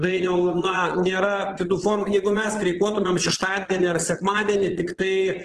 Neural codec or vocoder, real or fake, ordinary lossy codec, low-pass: vocoder, 22.05 kHz, 80 mel bands, Vocos; fake; Opus, 16 kbps; 9.9 kHz